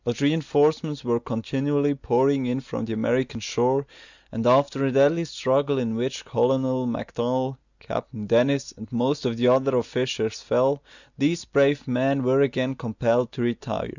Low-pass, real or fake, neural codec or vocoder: 7.2 kHz; real; none